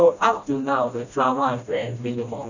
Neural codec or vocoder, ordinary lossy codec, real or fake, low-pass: codec, 16 kHz, 1 kbps, FreqCodec, smaller model; none; fake; 7.2 kHz